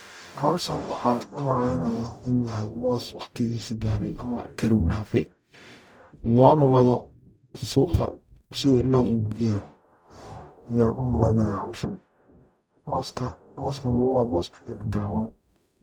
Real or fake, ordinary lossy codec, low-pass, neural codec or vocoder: fake; none; none; codec, 44.1 kHz, 0.9 kbps, DAC